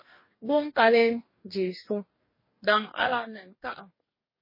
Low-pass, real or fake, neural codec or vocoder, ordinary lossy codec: 5.4 kHz; fake; codec, 44.1 kHz, 2.6 kbps, DAC; MP3, 24 kbps